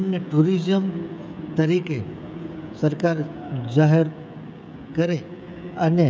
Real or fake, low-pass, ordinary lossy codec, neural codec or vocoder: fake; none; none; codec, 16 kHz, 16 kbps, FreqCodec, smaller model